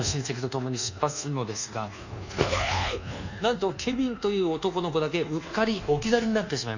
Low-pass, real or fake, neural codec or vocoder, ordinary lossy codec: 7.2 kHz; fake; codec, 24 kHz, 1.2 kbps, DualCodec; none